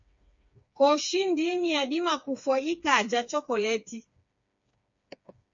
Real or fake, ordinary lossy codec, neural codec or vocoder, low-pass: fake; MP3, 48 kbps; codec, 16 kHz, 4 kbps, FreqCodec, smaller model; 7.2 kHz